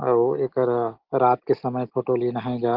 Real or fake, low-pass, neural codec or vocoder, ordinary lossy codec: real; 5.4 kHz; none; Opus, 16 kbps